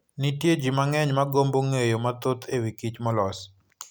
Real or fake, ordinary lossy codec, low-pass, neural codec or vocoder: real; none; none; none